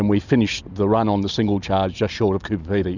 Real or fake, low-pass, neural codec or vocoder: real; 7.2 kHz; none